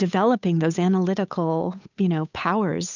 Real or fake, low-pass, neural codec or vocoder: fake; 7.2 kHz; codec, 16 kHz, 8 kbps, FunCodec, trained on Chinese and English, 25 frames a second